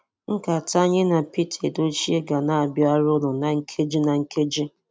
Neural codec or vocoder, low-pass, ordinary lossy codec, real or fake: none; none; none; real